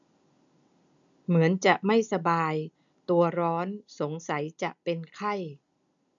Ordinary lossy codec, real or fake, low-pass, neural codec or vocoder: none; real; 7.2 kHz; none